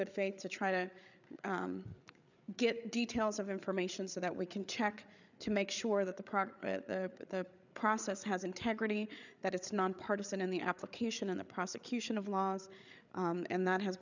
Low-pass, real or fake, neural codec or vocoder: 7.2 kHz; fake; codec, 16 kHz, 8 kbps, FreqCodec, larger model